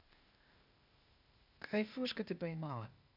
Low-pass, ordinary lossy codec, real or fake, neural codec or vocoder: 5.4 kHz; none; fake; codec, 16 kHz, 0.8 kbps, ZipCodec